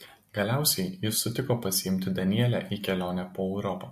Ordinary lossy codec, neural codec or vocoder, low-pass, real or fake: MP3, 64 kbps; none; 14.4 kHz; real